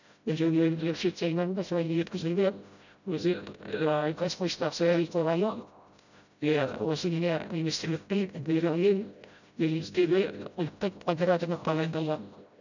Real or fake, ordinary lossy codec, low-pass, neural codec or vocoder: fake; none; 7.2 kHz; codec, 16 kHz, 0.5 kbps, FreqCodec, smaller model